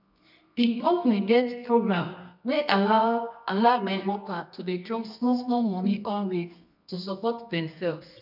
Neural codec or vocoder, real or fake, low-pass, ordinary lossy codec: codec, 24 kHz, 0.9 kbps, WavTokenizer, medium music audio release; fake; 5.4 kHz; none